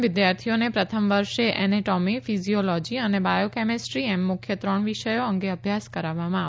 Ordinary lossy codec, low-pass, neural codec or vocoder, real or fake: none; none; none; real